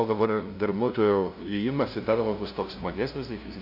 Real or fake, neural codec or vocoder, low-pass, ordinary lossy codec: fake; codec, 16 kHz, 0.5 kbps, FunCodec, trained on LibriTTS, 25 frames a second; 5.4 kHz; AAC, 48 kbps